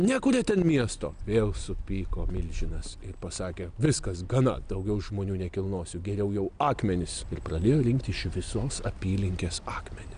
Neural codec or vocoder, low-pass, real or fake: none; 9.9 kHz; real